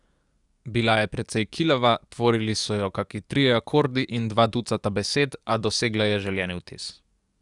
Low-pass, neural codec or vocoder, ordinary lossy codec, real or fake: 10.8 kHz; codec, 44.1 kHz, 7.8 kbps, DAC; Opus, 64 kbps; fake